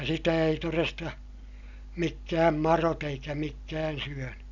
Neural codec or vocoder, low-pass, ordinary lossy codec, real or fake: none; 7.2 kHz; none; real